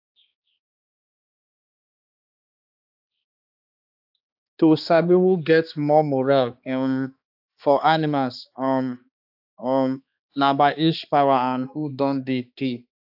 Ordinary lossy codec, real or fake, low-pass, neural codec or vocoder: AAC, 48 kbps; fake; 5.4 kHz; codec, 16 kHz, 2 kbps, X-Codec, HuBERT features, trained on balanced general audio